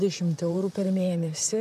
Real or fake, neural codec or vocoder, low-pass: fake; vocoder, 44.1 kHz, 128 mel bands, Pupu-Vocoder; 14.4 kHz